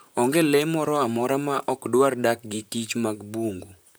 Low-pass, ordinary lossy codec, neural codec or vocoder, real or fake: none; none; vocoder, 44.1 kHz, 128 mel bands, Pupu-Vocoder; fake